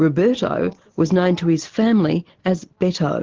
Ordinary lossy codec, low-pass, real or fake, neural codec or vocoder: Opus, 16 kbps; 7.2 kHz; real; none